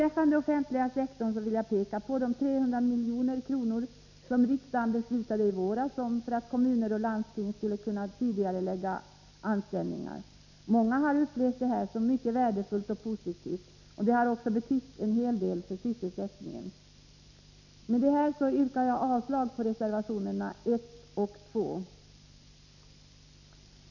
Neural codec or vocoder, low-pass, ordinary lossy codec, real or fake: none; 7.2 kHz; none; real